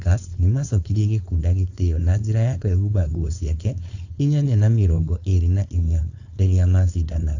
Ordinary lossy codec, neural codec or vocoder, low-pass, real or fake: AAC, 48 kbps; codec, 16 kHz, 4.8 kbps, FACodec; 7.2 kHz; fake